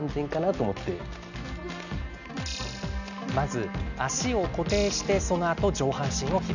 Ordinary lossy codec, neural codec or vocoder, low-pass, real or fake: none; none; 7.2 kHz; real